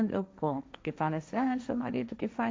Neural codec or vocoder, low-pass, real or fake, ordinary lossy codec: codec, 16 kHz, 1.1 kbps, Voila-Tokenizer; none; fake; none